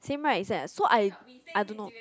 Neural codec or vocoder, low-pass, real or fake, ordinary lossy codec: none; none; real; none